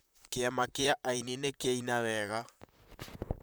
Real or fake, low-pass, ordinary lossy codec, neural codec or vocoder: fake; none; none; vocoder, 44.1 kHz, 128 mel bands, Pupu-Vocoder